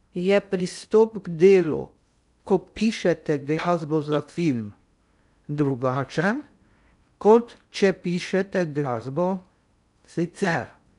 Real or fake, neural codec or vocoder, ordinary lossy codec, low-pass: fake; codec, 16 kHz in and 24 kHz out, 0.6 kbps, FocalCodec, streaming, 2048 codes; none; 10.8 kHz